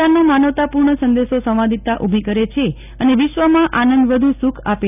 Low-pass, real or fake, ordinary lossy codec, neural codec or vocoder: 3.6 kHz; real; none; none